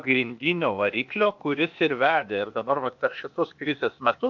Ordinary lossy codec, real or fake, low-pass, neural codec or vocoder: MP3, 64 kbps; fake; 7.2 kHz; codec, 16 kHz, 0.8 kbps, ZipCodec